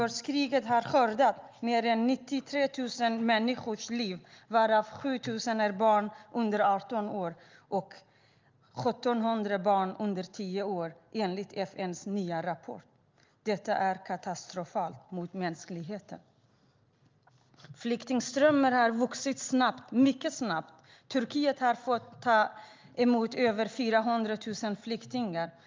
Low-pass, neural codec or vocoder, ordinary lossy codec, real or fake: 7.2 kHz; none; Opus, 24 kbps; real